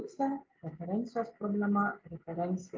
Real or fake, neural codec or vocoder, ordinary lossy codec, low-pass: real; none; Opus, 24 kbps; 7.2 kHz